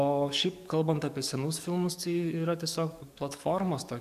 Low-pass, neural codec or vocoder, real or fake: 14.4 kHz; codec, 44.1 kHz, 7.8 kbps, DAC; fake